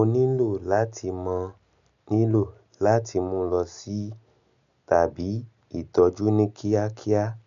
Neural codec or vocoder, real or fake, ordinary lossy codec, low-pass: none; real; none; 7.2 kHz